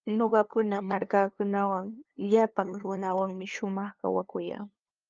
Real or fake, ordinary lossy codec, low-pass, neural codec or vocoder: fake; Opus, 24 kbps; 7.2 kHz; codec, 16 kHz, 2 kbps, FunCodec, trained on LibriTTS, 25 frames a second